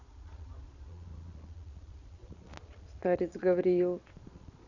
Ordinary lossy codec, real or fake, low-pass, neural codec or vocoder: none; fake; 7.2 kHz; vocoder, 22.05 kHz, 80 mel bands, Vocos